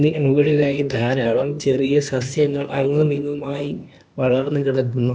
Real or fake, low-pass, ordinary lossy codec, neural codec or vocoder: fake; none; none; codec, 16 kHz, 0.8 kbps, ZipCodec